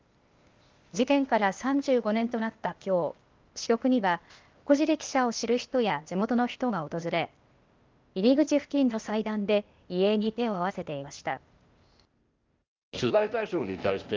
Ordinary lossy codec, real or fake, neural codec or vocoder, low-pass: Opus, 32 kbps; fake; codec, 16 kHz, 0.8 kbps, ZipCodec; 7.2 kHz